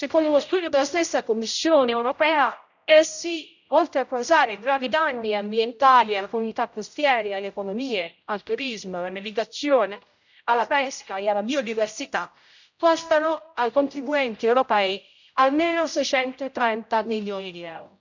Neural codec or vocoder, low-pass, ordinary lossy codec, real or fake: codec, 16 kHz, 0.5 kbps, X-Codec, HuBERT features, trained on general audio; 7.2 kHz; none; fake